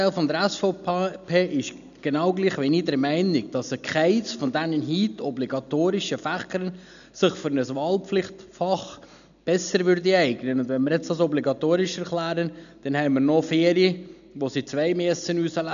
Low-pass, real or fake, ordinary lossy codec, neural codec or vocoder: 7.2 kHz; real; none; none